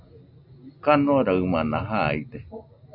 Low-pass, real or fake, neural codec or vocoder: 5.4 kHz; real; none